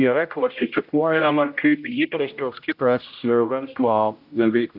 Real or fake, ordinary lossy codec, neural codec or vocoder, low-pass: fake; AAC, 48 kbps; codec, 16 kHz, 0.5 kbps, X-Codec, HuBERT features, trained on general audio; 5.4 kHz